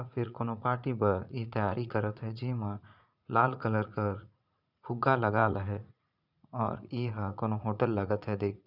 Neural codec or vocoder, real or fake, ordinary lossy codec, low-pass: vocoder, 44.1 kHz, 80 mel bands, Vocos; fake; none; 5.4 kHz